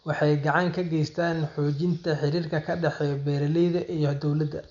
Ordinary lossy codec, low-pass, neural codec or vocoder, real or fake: none; 7.2 kHz; none; real